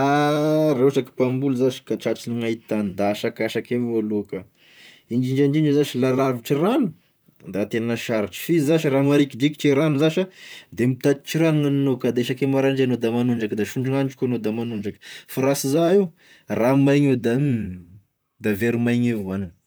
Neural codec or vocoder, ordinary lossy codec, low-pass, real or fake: vocoder, 44.1 kHz, 128 mel bands, Pupu-Vocoder; none; none; fake